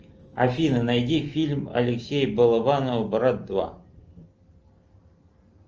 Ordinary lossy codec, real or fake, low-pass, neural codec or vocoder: Opus, 24 kbps; real; 7.2 kHz; none